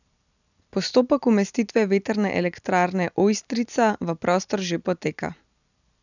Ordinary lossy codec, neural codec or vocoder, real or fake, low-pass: none; none; real; 7.2 kHz